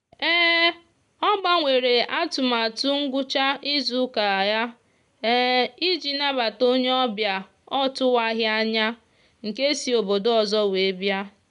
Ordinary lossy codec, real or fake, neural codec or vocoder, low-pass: none; real; none; 10.8 kHz